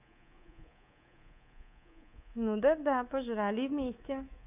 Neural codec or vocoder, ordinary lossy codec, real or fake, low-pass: codec, 24 kHz, 3.1 kbps, DualCodec; none; fake; 3.6 kHz